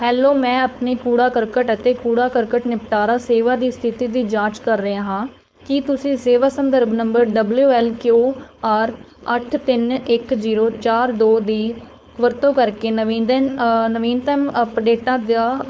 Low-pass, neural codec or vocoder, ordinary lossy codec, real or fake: none; codec, 16 kHz, 4.8 kbps, FACodec; none; fake